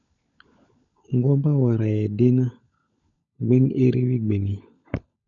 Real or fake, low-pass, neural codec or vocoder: fake; 7.2 kHz; codec, 16 kHz, 16 kbps, FunCodec, trained on LibriTTS, 50 frames a second